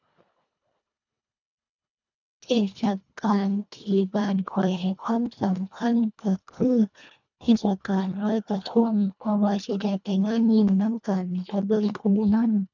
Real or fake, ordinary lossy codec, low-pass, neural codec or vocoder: fake; none; 7.2 kHz; codec, 24 kHz, 1.5 kbps, HILCodec